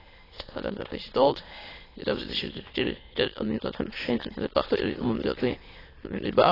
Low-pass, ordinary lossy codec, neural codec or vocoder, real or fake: 5.4 kHz; AAC, 24 kbps; autoencoder, 22.05 kHz, a latent of 192 numbers a frame, VITS, trained on many speakers; fake